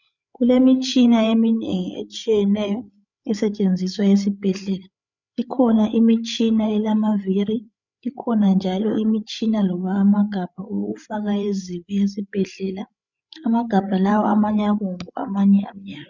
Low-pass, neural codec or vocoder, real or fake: 7.2 kHz; codec, 16 kHz, 8 kbps, FreqCodec, larger model; fake